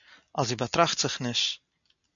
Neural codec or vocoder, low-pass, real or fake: none; 7.2 kHz; real